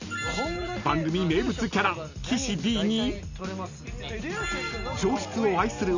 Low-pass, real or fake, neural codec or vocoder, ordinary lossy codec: 7.2 kHz; real; none; none